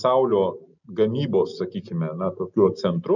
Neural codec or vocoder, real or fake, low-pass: none; real; 7.2 kHz